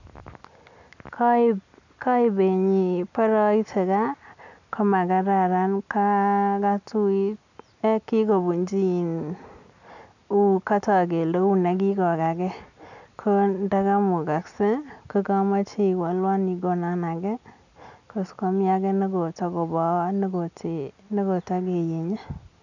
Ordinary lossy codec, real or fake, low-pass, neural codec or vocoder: none; real; 7.2 kHz; none